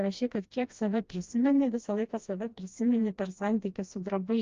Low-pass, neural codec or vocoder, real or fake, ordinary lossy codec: 7.2 kHz; codec, 16 kHz, 1 kbps, FreqCodec, smaller model; fake; Opus, 32 kbps